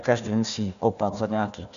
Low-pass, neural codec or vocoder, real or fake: 7.2 kHz; codec, 16 kHz, 1 kbps, FunCodec, trained on Chinese and English, 50 frames a second; fake